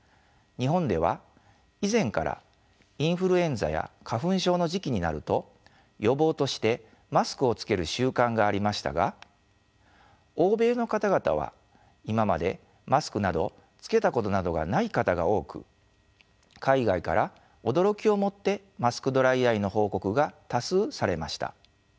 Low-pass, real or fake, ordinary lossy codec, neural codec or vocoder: none; real; none; none